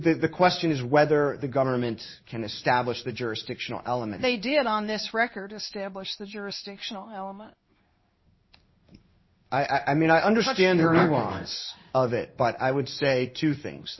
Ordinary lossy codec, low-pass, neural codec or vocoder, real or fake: MP3, 24 kbps; 7.2 kHz; codec, 16 kHz in and 24 kHz out, 1 kbps, XY-Tokenizer; fake